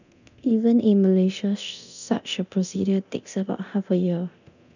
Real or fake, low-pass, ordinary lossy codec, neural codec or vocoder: fake; 7.2 kHz; none; codec, 24 kHz, 0.9 kbps, DualCodec